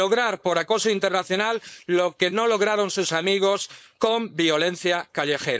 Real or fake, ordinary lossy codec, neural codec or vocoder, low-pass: fake; none; codec, 16 kHz, 4.8 kbps, FACodec; none